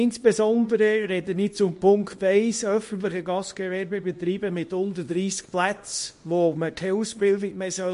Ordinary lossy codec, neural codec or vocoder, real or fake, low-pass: MP3, 48 kbps; codec, 24 kHz, 0.9 kbps, WavTokenizer, small release; fake; 10.8 kHz